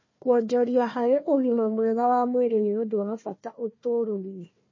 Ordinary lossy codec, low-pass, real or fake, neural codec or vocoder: MP3, 32 kbps; 7.2 kHz; fake; codec, 16 kHz, 1 kbps, FunCodec, trained on Chinese and English, 50 frames a second